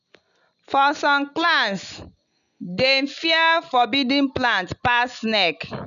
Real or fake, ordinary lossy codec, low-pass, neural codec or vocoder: real; none; 7.2 kHz; none